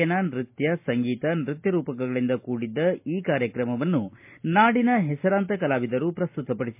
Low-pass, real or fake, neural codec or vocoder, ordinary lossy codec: 3.6 kHz; real; none; MP3, 32 kbps